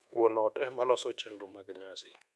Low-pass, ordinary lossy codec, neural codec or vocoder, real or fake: none; none; codec, 24 kHz, 1.2 kbps, DualCodec; fake